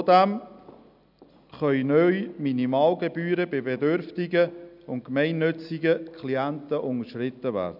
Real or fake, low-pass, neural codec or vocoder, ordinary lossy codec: real; 5.4 kHz; none; none